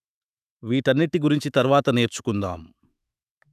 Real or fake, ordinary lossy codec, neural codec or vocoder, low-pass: fake; none; autoencoder, 48 kHz, 128 numbers a frame, DAC-VAE, trained on Japanese speech; 14.4 kHz